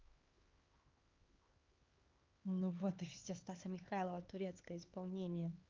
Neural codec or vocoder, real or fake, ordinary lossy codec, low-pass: codec, 16 kHz, 4 kbps, X-Codec, HuBERT features, trained on LibriSpeech; fake; Opus, 24 kbps; 7.2 kHz